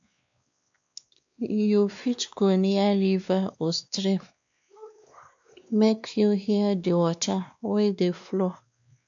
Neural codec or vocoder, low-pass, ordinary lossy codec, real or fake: codec, 16 kHz, 2 kbps, X-Codec, WavLM features, trained on Multilingual LibriSpeech; 7.2 kHz; AAC, 64 kbps; fake